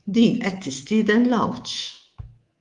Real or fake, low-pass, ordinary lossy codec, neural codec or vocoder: fake; 10.8 kHz; Opus, 24 kbps; codec, 24 kHz, 3.1 kbps, DualCodec